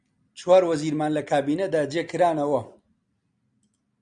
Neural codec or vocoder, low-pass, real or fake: none; 9.9 kHz; real